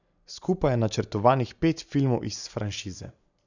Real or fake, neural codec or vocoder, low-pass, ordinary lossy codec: real; none; 7.2 kHz; none